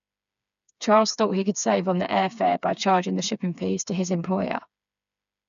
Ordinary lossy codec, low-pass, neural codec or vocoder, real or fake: none; 7.2 kHz; codec, 16 kHz, 4 kbps, FreqCodec, smaller model; fake